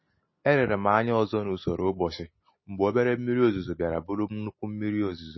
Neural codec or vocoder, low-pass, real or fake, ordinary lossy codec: none; 7.2 kHz; real; MP3, 24 kbps